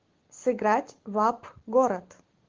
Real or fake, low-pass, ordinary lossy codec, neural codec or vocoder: real; 7.2 kHz; Opus, 16 kbps; none